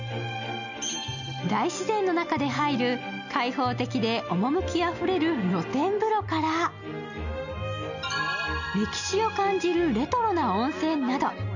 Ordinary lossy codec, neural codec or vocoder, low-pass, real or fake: none; none; 7.2 kHz; real